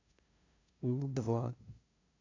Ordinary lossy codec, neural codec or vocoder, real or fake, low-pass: MP3, 64 kbps; codec, 16 kHz, 0.5 kbps, FunCodec, trained on LibriTTS, 25 frames a second; fake; 7.2 kHz